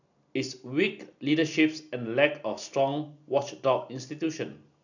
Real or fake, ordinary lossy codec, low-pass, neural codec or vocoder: real; none; 7.2 kHz; none